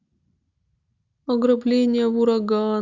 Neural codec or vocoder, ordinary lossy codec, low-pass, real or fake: none; Opus, 64 kbps; 7.2 kHz; real